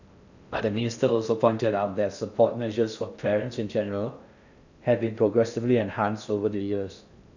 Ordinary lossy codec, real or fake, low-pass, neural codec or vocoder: none; fake; 7.2 kHz; codec, 16 kHz in and 24 kHz out, 0.6 kbps, FocalCodec, streaming, 4096 codes